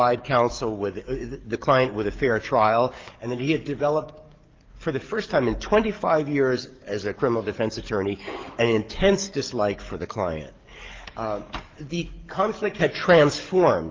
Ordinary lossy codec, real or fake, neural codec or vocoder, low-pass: Opus, 32 kbps; fake; codec, 44.1 kHz, 7.8 kbps, DAC; 7.2 kHz